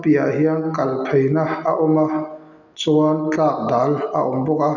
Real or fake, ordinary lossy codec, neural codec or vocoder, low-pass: real; none; none; 7.2 kHz